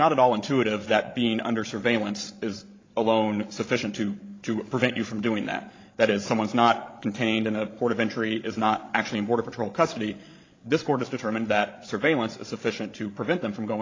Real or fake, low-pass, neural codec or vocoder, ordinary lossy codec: fake; 7.2 kHz; codec, 16 kHz, 16 kbps, FreqCodec, larger model; AAC, 32 kbps